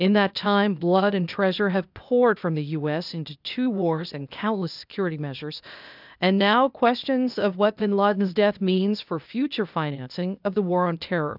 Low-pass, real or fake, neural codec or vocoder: 5.4 kHz; fake; codec, 16 kHz, 0.8 kbps, ZipCodec